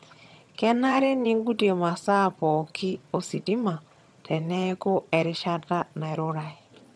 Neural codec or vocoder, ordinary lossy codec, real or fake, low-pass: vocoder, 22.05 kHz, 80 mel bands, HiFi-GAN; none; fake; none